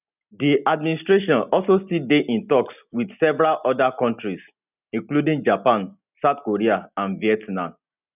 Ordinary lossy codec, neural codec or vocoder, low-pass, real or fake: none; none; 3.6 kHz; real